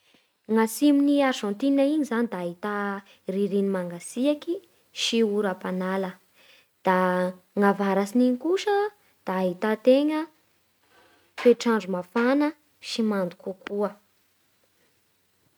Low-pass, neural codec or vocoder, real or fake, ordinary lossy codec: none; none; real; none